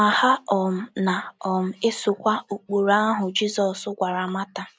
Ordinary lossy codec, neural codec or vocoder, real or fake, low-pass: none; none; real; none